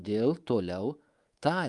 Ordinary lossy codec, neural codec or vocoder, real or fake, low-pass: Opus, 32 kbps; codec, 24 kHz, 3.1 kbps, DualCodec; fake; 10.8 kHz